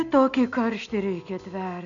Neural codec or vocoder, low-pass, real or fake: none; 7.2 kHz; real